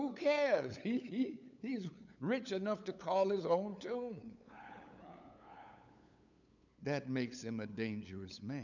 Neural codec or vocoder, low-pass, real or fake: codec, 16 kHz, 8 kbps, FunCodec, trained on LibriTTS, 25 frames a second; 7.2 kHz; fake